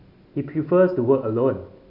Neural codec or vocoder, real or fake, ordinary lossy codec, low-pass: none; real; none; 5.4 kHz